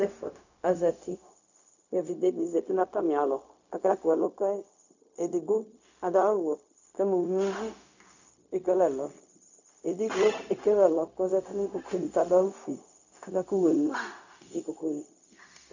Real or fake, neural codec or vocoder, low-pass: fake; codec, 16 kHz, 0.4 kbps, LongCat-Audio-Codec; 7.2 kHz